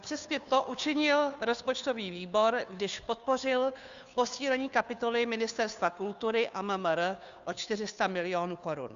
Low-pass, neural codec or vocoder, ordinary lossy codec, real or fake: 7.2 kHz; codec, 16 kHz, 2 kbps, FunCodec, trained on Chinese and English, 25 frames a second; Opus, 64 kbps; fake